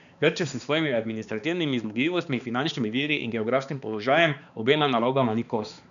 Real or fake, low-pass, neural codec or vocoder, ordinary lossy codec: fake; 7.2 kHz; codec, 16 kHz, 2 kbps, X-Codec, HuBERT features, trained on balanced general audio; none